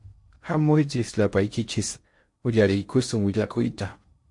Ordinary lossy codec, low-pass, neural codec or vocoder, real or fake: MP3, 48 kbps; 10.8 kHz; codec, 16 kHz in and 24 kHz out, 0.6 kbps, FocalCodec, streaming, 4096 codes; fake